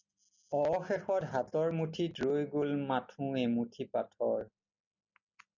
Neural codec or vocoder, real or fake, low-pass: none; real; 7.2 kHz